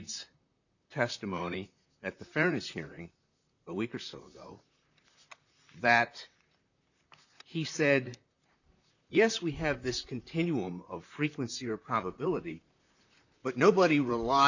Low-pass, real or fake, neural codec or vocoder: 7.2 kHz; fake; vocoder, 44.1 kHz, 128 mel bands, Pupu-Vocoder